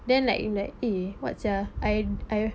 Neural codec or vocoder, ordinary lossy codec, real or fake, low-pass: none; none; real; none